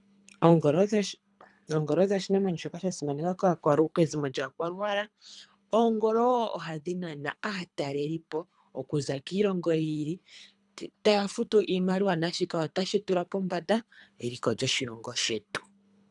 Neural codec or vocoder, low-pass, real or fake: codec, 24 kHz, 3 kbps, HILCodec; 10.8 kHz; fake